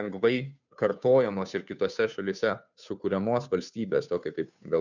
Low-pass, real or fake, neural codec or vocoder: 7.2 kHz; fake; codec, 16 kHz, 2 kbps, FunCodec, trained on Chinese and English, 25 frames a second